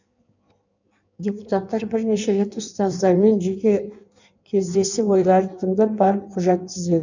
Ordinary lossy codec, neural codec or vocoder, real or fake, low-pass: none; codec, 16 kHz in and 24 kHz out, 1.1 kbps, FireRedTTS-2 codec; fake; 7.2 kHz